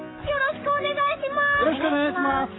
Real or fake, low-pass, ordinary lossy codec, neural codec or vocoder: fake; 7.2 kHz; AAC, 16 kbps; codec, 44.1 kHz, 7.8 kbps, Pupu-Codec